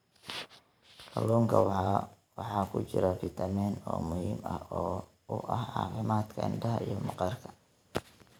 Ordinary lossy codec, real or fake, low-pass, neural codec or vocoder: none; fake; none; vocoder, 44.1 kHz, 128 mel bands every 512 samples, BigVGAN v2